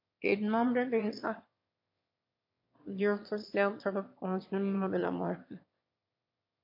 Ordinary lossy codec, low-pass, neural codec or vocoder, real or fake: MP3, 32 kbps; 5.4 kHz; autoencoder, 22.05 kHz, a latent of 192 numbers a frame, VITS, trained on one speaker; fake